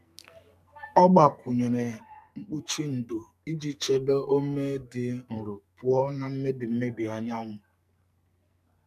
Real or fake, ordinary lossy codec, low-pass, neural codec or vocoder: fake; none; 14.4 kHz; codec, 44.1 kHz, 2.6 kbps, SNAC